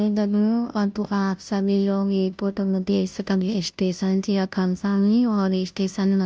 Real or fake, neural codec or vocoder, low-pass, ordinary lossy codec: fake; codec, 16 kHz, 0.5 kbps, FunCodec, trained on Chinese and English, 25 frames a second; none; none